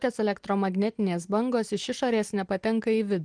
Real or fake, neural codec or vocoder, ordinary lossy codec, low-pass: real; none; Opus, 24 kbps; 9.9 kHz